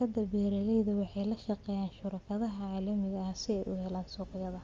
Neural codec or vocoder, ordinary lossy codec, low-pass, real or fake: none; Opus, 32 kbps; 7.2 kHz; real